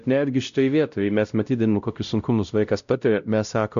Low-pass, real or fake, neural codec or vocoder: 7.2 kHz; fake; codec, 16 kHz, 0.5 kbps, X-Codec, WavLM features, trained on Multilingual LibriSpeech